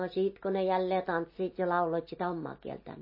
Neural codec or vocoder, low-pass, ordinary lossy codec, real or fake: none; 5.4 kHz; MP3, 24 kbps; real